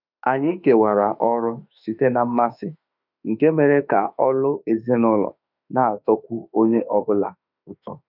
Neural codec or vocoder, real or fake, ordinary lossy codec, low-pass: autoencoder, 48 kHz, 32 numbers a frame, DAC-VAE, trained on Japanese speech; fake; none; 5.4 kHz